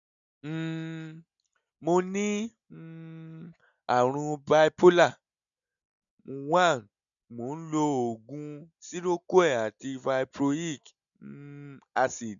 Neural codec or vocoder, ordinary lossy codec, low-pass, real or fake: none; none; 7.2 kHz; real